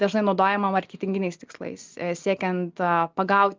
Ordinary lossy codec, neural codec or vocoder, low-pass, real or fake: Opus, 32 kbps; none; 7.2 kHz; real